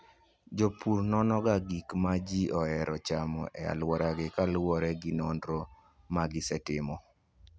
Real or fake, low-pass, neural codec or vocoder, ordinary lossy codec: real; none; none; none